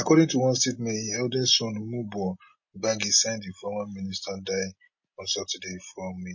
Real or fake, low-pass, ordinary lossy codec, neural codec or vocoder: real; 7.2 kHz; MP3, 32 kbps; none